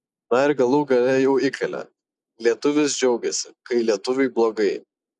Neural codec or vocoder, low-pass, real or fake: none; 10.8 kHz; real